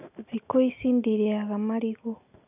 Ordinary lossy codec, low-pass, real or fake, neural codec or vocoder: none; 3.6 kHz; real; none